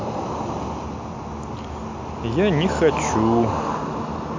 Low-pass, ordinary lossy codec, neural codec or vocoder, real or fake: 7.2 kHz; MP3, 48 kbps; none; real